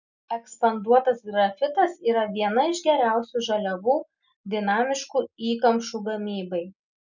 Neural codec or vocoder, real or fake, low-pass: none; real; 7.2 kHz